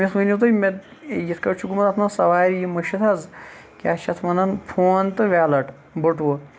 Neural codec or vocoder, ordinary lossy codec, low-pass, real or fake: none; none; none; real